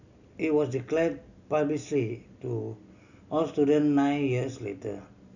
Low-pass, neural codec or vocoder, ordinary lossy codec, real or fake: 7.2 kHz; none; none; real